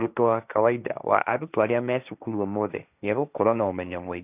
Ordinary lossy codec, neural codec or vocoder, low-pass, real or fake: none; codec, 16 kHz, 1.1 kbps, Voila-Tokenizer; 3.6 kHz; fake